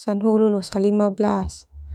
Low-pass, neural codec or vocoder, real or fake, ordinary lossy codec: 19.8 kHz; autoencoder, 48 kHz, 32 numbers a frame, DAC-VAE, trained on Japanese speech; fake; none